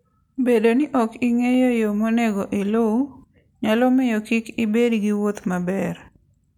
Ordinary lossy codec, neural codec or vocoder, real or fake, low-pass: none; none; real; 19.8 kHz